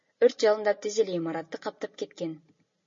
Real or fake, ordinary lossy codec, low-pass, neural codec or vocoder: real; MP3, 32 kbps; 7.2 kHz; none